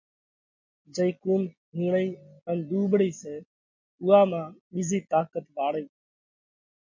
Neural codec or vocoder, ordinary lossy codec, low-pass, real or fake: none; MP3, 48 kbps; 7.2 kHz; real